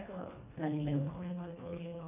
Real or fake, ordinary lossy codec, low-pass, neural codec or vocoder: fake; none; 3.6 kHz; codec, 24 kHz, 1.5 kbps, HILCodec